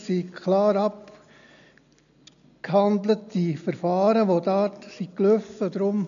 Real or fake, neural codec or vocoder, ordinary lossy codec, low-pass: real; none; none; 7.2 kHz